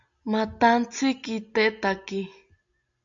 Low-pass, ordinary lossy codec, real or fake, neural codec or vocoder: 7.2 kHz; AAC, 64 kbps; real; none